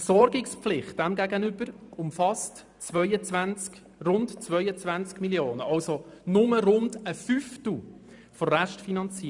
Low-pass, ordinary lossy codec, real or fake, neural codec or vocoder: 10.8 kHz; none; fake; vocoder, 44.1 kHz, 128 mel bands every 512 samples, BigVGAN v2